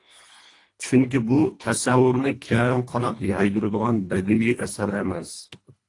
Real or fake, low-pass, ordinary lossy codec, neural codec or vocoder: fake; 10.8 kHz; AAC, 48 kbps; codec, 24 kHz, 1.5 kbps, HILCodec